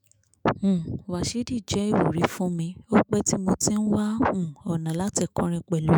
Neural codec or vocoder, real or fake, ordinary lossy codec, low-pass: autoencoder, 48 kHz, 128 numbers a frame, DAC-VAE, trained on Japanese speech; fake; none; none